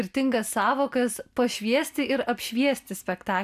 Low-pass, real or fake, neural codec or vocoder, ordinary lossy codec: 14.4 kHz; real; none; AAC, 96 kbps